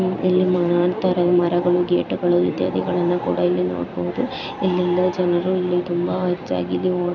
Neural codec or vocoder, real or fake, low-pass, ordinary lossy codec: vocoder, 44.1 kHz, 128 mel bands every 256 samples, BigVGAN v2; fake; 7.2 kHz; none